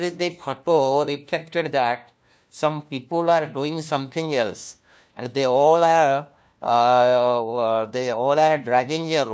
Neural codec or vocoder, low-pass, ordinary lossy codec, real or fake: codec, 16 kHz, 1 kbps, FunCodec, trained on LibriTTS, 50 frames a second; none; none; fake